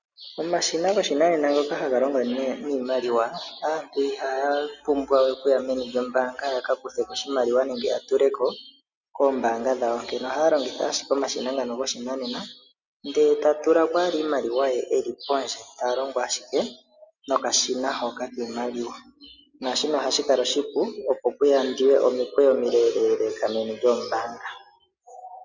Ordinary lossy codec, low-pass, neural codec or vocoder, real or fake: Opus, 64 kbps; 7.2 kHz; none; real